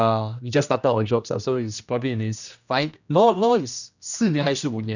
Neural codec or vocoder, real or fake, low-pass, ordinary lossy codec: codec, 16 kHz, 1 kbps, X-Codec, HuBERT features, trained on general audio; fake; 7.2 kHz; none